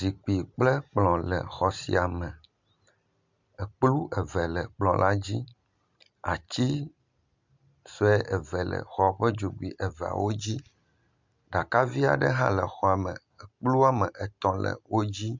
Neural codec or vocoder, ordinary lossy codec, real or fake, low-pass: none; MP3, 64 kbps; real; 7.2 kHz